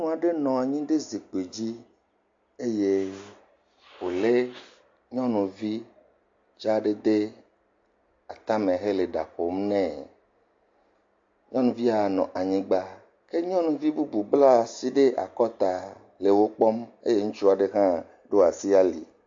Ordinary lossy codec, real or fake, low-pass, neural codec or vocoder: AAC, 48 kbps; real; 7.2 kHz; none